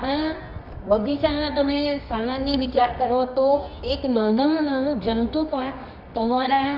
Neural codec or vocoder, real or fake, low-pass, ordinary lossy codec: codec, 24 kHz, 0.9 kbps, WavTokenizer, medium music audio release; fake; 5.4 kHz; none